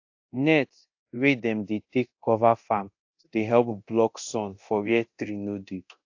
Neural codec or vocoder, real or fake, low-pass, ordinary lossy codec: codec, 24 kHz, 0.9 kbps, DualCodec; fake; 7.2 kHz; AAC, 48 kbps